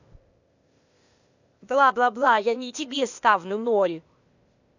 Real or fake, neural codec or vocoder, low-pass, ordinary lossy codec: fake; codec, 16 kHz, 0.8 kbps, ZipCodec; 7.2 kHz; none